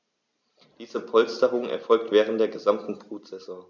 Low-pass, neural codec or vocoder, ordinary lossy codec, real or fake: 7.2 kHz; none; none; real